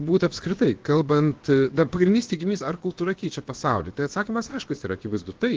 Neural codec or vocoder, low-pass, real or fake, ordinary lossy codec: codec, 16 kHz, about 1 kbps, DyCAST, with the encoder's durations; 7.2 kHz; fake; Opus, 16 kbps